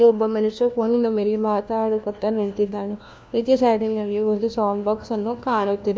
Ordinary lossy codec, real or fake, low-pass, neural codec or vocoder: none; fake; none; codec, 16 kHz, 1 kbps, FunCodec, trained on LibriTTS, 50 frames a second